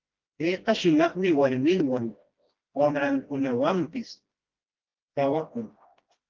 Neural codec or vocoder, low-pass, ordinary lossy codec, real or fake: codec, 16 kHz, 1 kbps, FreqCodec, smaller model; 7.2 kHz; Opus, 32 kbps; fake